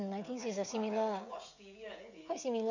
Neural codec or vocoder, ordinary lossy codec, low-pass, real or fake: codec, 16 kHz, 16 kbps, FreqCodec, smaller model; none; 7.2 kHz; fake